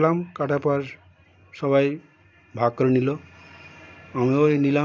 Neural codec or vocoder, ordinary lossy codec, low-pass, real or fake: none; none; none; real